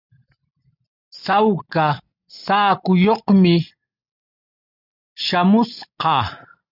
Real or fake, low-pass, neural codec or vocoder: real; 5.4 kHz; none